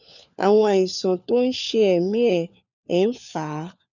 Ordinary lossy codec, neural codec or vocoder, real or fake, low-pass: none; codec, 16 kHz, 4 kbps, FunCodec, trained on LibriTTS, 50 frames a second; fake; 7.2 kHz